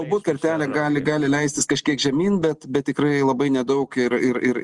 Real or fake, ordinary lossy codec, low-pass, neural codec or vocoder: real; Opus, 24 kbps; 10.8 kHz; none